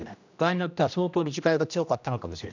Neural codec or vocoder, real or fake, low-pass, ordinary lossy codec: codec, 16 kHz, 1 kbps, X-Codec, HuBERT features, trained on general audio; fake; 7.2 kHz; none